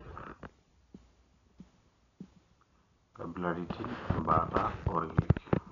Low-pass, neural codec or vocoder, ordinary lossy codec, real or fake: 7.2 kHz; none; none; real